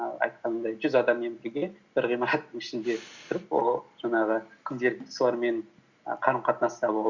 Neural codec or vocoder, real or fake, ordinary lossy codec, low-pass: none; real; Opus, 64 kbps; 7.2 kHz